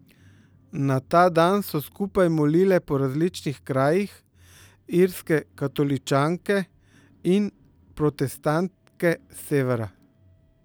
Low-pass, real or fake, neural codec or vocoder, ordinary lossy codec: none; real; none; none